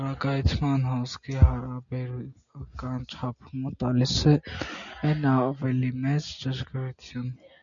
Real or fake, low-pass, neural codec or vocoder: real; 7.2 kHz; none